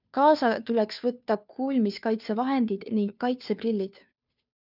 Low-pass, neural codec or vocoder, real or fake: 5.4 kHz; codec, 16 kHz, 2 kbps, FunCodec, trained on Chinese and English, 25 frames a second; fake